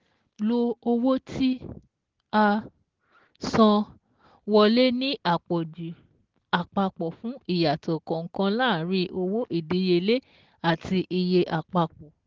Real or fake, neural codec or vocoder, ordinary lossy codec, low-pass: real; none; Opus, 16 kbps; 7.2 kHz